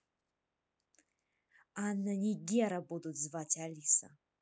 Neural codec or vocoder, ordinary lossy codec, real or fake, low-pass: none; none; real; none